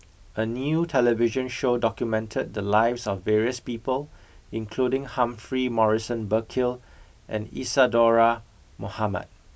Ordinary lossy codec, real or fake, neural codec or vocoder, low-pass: none; real; none; none